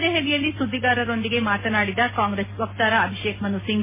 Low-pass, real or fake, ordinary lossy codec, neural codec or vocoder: 3.6 kHz; real; MP3, 16 kbps; none